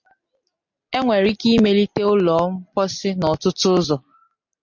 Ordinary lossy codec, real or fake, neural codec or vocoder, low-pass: MP3, 48 kbps; real; none; 7.2 kHz